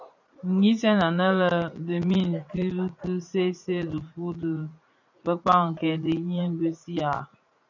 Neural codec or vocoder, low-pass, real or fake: vocoder, 44.1 kHz, 80 mel bands, Vocos; 7.2 kHz; fake